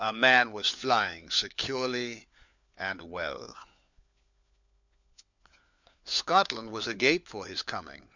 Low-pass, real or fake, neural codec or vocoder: 7.2 kHz; fake; codec, 16 kHz, 4 kbps, FunCodec, trained on LibriTTS, 50 frames a second